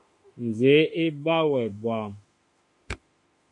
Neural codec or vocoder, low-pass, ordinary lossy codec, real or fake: autoencoder, 48 kHz, 32 numbers a frame, DAC-VAE, trained on Japanese speech; 10.8 kHz; MP3, 48 kbps; fake